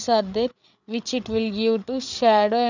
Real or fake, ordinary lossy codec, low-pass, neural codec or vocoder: fake; none; 7.2 kHz; codec, 16 kHz, 8 kbps, FreqCodec, larger model